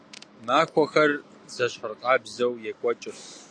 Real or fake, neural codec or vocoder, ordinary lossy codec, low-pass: real; none; AAC, 48 kbps; 9.9 kHz